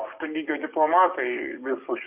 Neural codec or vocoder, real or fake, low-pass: codec, 44.1 kHz, 7.8 kbps, DAC; fake; 3.6 kHz